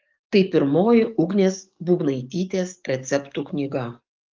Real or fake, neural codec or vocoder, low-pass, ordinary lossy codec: fake; codec, 44.1 kHz, 7.8 kbps, DAC; 7.2 kHz; Opus, 32 kbps